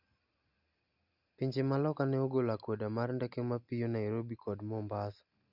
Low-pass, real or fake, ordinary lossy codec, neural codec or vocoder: 5.4 kHz; real; Opus, 64 kbps; none